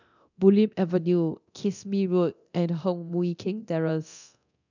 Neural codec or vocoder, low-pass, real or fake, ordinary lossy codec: codec, 24 kHz, 0.9 kbps, DualCodec; 7.2 kHz; fake; none